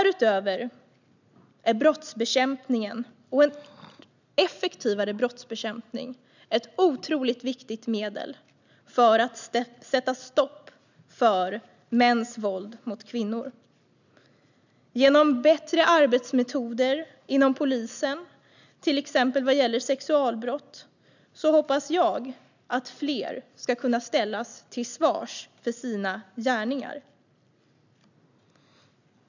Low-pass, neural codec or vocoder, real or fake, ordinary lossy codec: 7.2 kHz; none; real; none